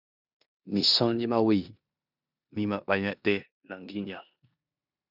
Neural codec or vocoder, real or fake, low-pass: codec, 16 kHz in and 24 kHz out, 0.9 kbps, LongCat-Audio-Codec, four codebook decoder; fake; 5.4 kHz